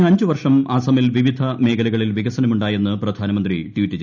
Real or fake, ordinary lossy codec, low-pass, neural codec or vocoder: real; none; 7.2 kHz; none